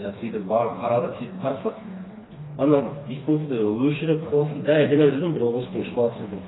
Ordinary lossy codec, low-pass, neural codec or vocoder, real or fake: AAC, 16 kbps; 7.2 kHz; codec, 16 kHz, 2 kbps, FreqCodec, smaller model; fake